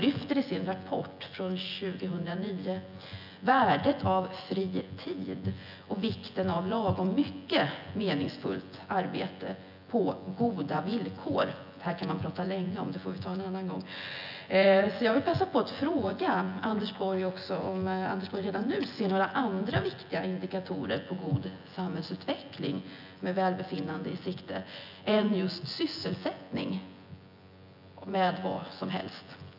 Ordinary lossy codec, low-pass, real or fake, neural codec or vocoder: none; 5.4 kHz; fake; vocoder, 24 kHz, 100 mel bands, Vocos